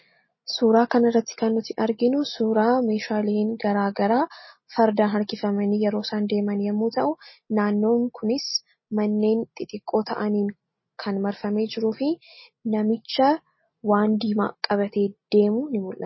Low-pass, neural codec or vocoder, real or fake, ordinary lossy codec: 7.2 kHz; none; real; MP3, 24 kbps